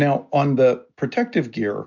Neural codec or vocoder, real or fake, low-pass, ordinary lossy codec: none; real; 7.2 kHz; MP3, 64 kbps